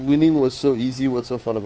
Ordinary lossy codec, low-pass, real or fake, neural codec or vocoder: none; none; fake; codec, 16 kHz, 2 kbps, FunCodec, trained on Chinese and English, 25 frames a second